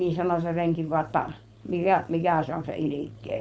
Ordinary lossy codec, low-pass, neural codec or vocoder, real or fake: none; none; codec, 16 kHz, 4.8 kbps, FACodec; fake